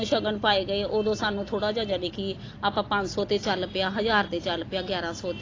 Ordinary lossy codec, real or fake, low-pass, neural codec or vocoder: AAC, 32 kbps; real; 7.2 kHz; none